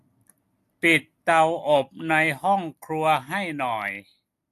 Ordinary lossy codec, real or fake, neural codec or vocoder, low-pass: AAC, 64 kbps; real; none; 14.4 kHz